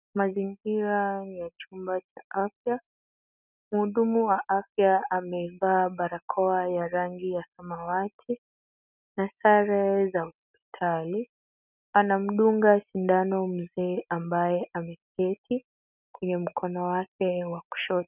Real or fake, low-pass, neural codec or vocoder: real; 3.6 kHz; none